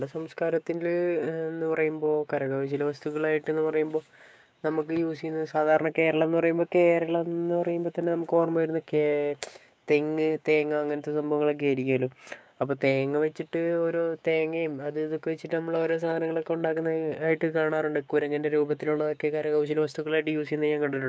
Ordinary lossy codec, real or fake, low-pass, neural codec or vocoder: none; fake; none; codec, 16 kHz, 6 kbps, DAC